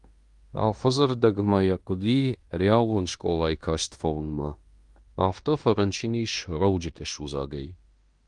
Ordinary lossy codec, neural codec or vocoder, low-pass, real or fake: Opus, 32 kbps; codec, 16 kHz in and 24 kHz out, 0.9 kbps, LongCat-Audio-Codec, fine tuned four codebook decoder; 10.8 kHz; fake